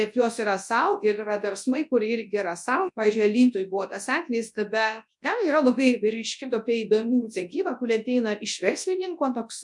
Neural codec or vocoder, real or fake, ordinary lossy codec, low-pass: codec, 24 kHz, 0.9 kbps, WavTokenizer, large speech release; fake; MP3, 64 kbps; 10.8 kHz